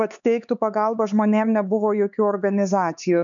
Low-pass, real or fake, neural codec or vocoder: 7.2 kHz; fake; codec, 16 kHz, 4 kbps, X-Codec, HuBERT features, trained on LibriSpeech